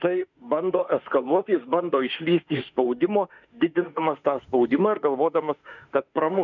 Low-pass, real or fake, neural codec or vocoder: 7.2 kHz; fake; autoencoder, 48 kHz, 32 numbers a frame, DAC-VAE, trained on Japanese speech